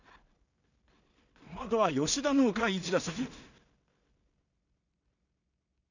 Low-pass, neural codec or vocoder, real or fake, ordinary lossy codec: 7.2 kHz; codec, 16 kHz in and 24 kHz out, 0.4 kbps, LongCat-Audio-Codec, two codebook decoder; fake; none